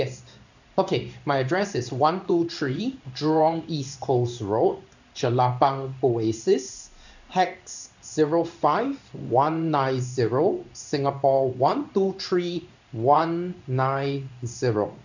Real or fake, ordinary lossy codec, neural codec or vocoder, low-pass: fake; none; codec, 16 kHz in and 24 kHz out, 1 kbps, XY-Tokenizer; 7.2 kHz